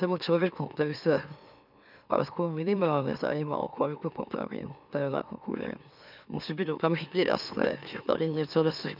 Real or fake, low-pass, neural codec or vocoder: fake; 5.4 kHz; autoencoder, 44.1 kHz, a latent of 192 numbers a frame, MeloTTS